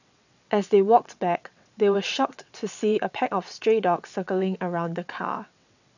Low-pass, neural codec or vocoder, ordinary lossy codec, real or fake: 7.2 kHz; vocoder, 22.05 kHz, 80 mel bands, WaveNeXt; none; fake